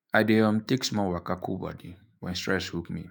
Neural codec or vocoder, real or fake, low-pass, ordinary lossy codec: autoencoder, 48 kHz, 128 numbers a frame, DAC-VAE, trained on Japanese speech; fake; none; none